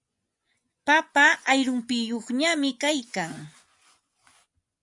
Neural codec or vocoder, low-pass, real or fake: vocoder, 24 kHz, 100 mel bands, Vocos; 10.8 kHz; fake